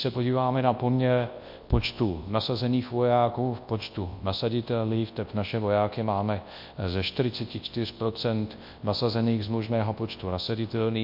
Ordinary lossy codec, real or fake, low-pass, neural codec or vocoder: MP3, 32 kbps; fake; 5.4 kHz; codec, 24 kHz, 0.9 kbps, WavTokenizer, large speech release